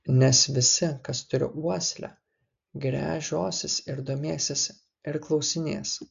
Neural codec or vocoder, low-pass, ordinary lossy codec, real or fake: none; 7.2 kHz; AAC, 64 kbps; real